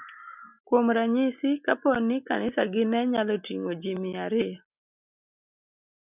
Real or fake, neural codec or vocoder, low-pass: real; none; 3.6 kHz